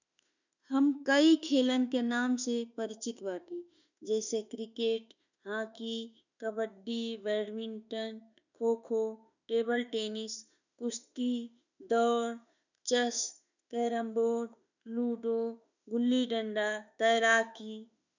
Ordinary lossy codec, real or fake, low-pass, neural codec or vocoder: none; fake; 7.2 kHz; autoencoder, 48 kHz, 32 numbers a frame, DAC-VAE, trained on Japanese speech